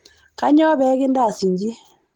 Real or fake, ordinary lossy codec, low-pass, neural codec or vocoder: real; Opus, 16 kbps; 19.8 kHz; none